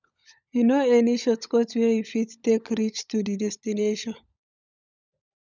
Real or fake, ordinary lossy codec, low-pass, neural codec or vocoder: fake; none; 7.2 kHz; codec, 16 kHz, 16 kbps, FunCodec, trained on LibriTTS, 50 frames a second